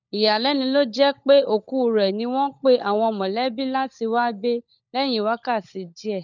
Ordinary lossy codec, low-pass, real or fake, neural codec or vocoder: none; 7.2 kHz; fake; codec, 16 kHz, 16 kbps, FunCodec, trained on LibriTTS, 50 frames a second